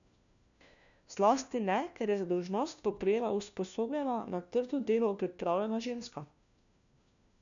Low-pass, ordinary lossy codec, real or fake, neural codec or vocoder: 7.2 kHz; none; fake; codec, 16 kHz, 1 kbps, FunCodec, trained on LibriTTS, 50 frames a second